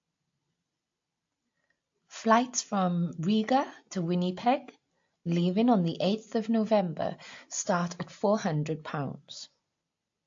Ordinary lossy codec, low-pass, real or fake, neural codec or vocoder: AAC, 48 kbps; 7.2 kHz; real; none